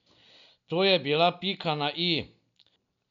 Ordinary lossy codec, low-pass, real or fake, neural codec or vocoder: none; 7.2 kHz; real; none